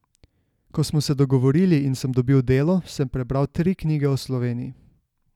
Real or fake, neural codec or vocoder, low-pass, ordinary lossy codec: real; none; 19.8 kHz; none